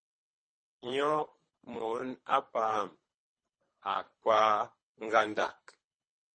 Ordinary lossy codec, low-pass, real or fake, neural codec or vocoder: MP3, 32 kbps; 9.9 kHz; fake; codec, 24 kHz, 3 kbps, HILCodec